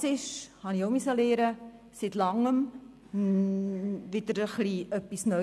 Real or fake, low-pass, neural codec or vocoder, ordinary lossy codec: real; none; none; none